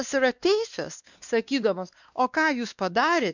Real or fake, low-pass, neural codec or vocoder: fake; 7.2 kHz; codec, 16 kHz, 2 kbps, X-Codec, WavLM features, trained on Multilingual LibriSpeech